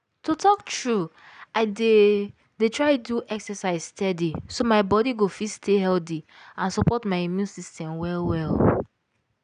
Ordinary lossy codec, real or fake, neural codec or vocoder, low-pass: none; real; none; 9.9 kHz